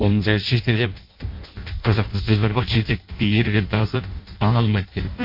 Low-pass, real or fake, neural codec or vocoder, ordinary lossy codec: 5.4 kHz; fake; codec, 16 kHz in and 24 kHz out, 0.6 kbps, FireRedTTS-2 codec; MP3, 32 kbps